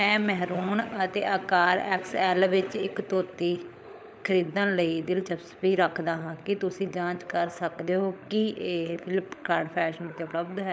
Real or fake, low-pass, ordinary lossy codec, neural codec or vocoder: fake; none; none; codec, 16 kHz, 16 kbps, FunCodec, trained on LibriTTS, 50 frames a second